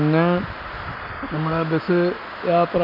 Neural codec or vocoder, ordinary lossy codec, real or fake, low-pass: none; none; real; 5.4 kHz